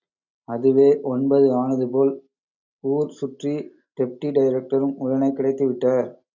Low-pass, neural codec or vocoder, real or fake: 7.2 kHz; none; real